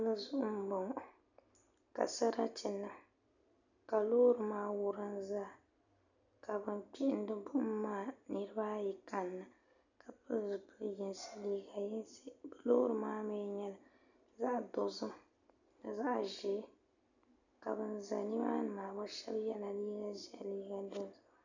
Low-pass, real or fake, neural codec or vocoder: 7.2 kHz; real; none